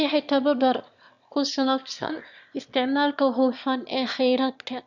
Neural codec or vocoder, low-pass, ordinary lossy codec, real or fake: autoencoder, 22.05 kHz, a latent of 192 numbers a frame, VITS, trained on one speaker; 7.2 kHz; none; fake